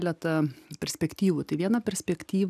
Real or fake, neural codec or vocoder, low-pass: real; none; 14.4 kHz